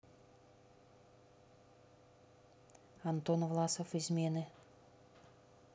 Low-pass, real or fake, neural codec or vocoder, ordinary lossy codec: none; real; none; none